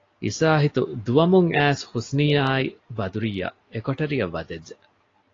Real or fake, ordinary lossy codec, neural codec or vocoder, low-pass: real; AAC, 32 kbps; none; 7.2 kHz